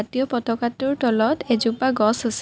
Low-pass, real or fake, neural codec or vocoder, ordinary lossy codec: none; real; none; none